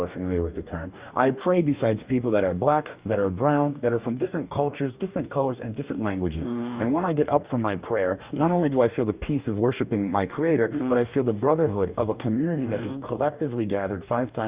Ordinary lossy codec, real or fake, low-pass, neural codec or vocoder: Opus, 64 kbps; fake; 3.6 kHz; codec, 44.1 kHz, 2.6 kbps, DAC